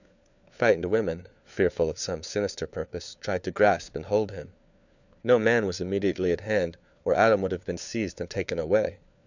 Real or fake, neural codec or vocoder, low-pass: fake; codec, 16 kHz, 4 kbps, FunCodec, trained on LibriTTS, 50 frames a second; 7.2 kHz